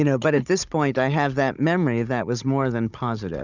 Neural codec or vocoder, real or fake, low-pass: codec, 16 kHz, 16 kbps, FunCodec, trained on Chinese and English, 50 frames a second; fake; 7.2 kHz